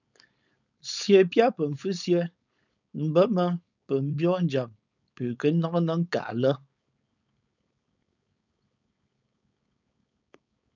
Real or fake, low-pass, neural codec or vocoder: fake; 7.2 kHz; codec, 16 kHz, 4.8 kbps, FACodec